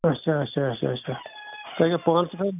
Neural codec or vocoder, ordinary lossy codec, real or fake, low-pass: none; none; real; 3.6 kHz